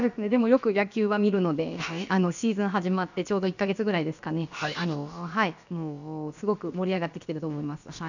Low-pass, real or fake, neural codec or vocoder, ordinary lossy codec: 7.2 kHz; fake; codec, 16 kHz, about 1 kbps, DyCAST, with the encoder's durations; none